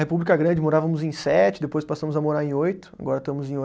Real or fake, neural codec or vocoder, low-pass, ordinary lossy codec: real; none; none; none